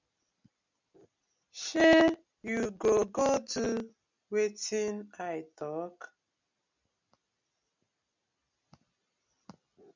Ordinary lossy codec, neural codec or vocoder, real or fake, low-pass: MP3, 64 kbps; none; real; 7.2 kHz